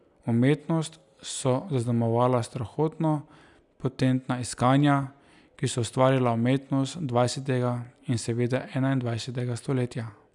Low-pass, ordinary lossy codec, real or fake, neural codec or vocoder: 10.8 kHz; none; real; none